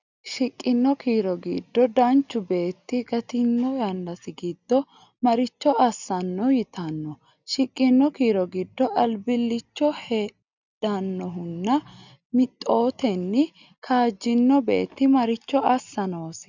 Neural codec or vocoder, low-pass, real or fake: none; 7.2 kHz; real